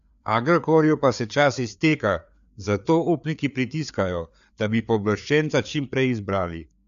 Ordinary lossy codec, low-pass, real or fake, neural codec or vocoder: none; 7.2 kHz; fake; codec, 16 kHz, 4 kbps, FreqCodec, larger model